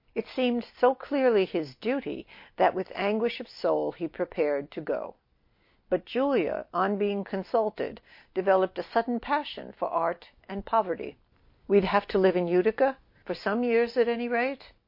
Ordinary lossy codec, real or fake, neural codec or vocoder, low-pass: MP3, 32 kbps; real; none; 5.4 kHz